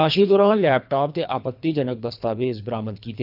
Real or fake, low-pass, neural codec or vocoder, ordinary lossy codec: fake; 5.4 kHz; codec, 24 kHz, 3 kbps, HILCodec; none